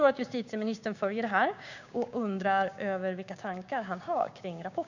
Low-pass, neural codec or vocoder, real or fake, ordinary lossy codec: 7.2 kHz; codec, 16 kHz, 6 kbps, DAC; fake; none